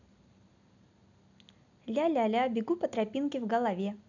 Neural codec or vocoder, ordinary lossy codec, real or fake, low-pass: none; none; real; 7.2 kHz